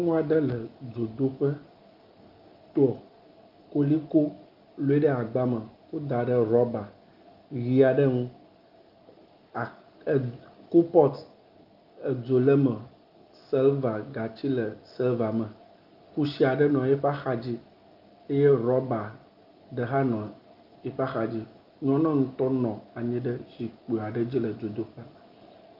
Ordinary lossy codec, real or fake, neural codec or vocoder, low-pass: Opus, 32 kbps; real; none; 5.4 kHz